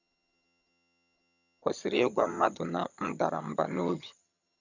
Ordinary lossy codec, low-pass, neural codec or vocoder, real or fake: none; 7.2 kHz; vocoder, 22.05 kHz, 80 mel bands, HiFi-GAN; fake